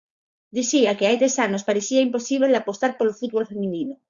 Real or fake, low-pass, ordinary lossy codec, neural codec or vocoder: fake; 7.2 kHz; Opus, 64 kbps; codec, 16 kHz, 4.8 kbps, FACodec